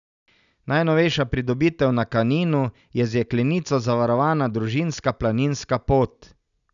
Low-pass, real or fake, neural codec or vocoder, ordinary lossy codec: 7.2 kHz; real; none; none